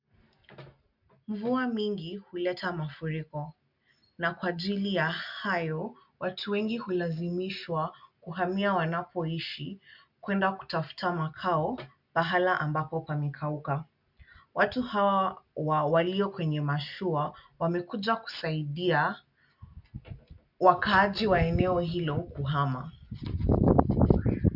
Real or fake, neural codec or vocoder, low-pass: real; none; 5.4 kHz